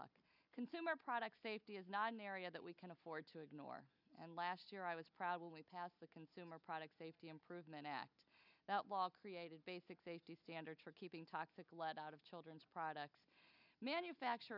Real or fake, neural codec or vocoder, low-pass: real; none; 5.4 kHz